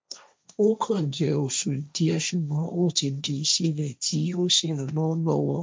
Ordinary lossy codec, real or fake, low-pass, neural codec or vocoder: none; fake; none; codec, 16 kHz, 1.1 kbps, Voila-Tokenizer